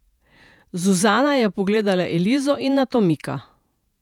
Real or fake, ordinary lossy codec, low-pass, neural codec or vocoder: fake; none; 19.8 kHz; vocoder, 48 kHz, 128 mel bands, Vocos